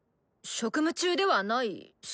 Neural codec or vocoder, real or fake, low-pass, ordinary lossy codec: none; real; none; none